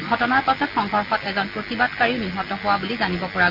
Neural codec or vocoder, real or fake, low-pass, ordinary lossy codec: none; real; 5.4 kHz; Opus, 64 kbps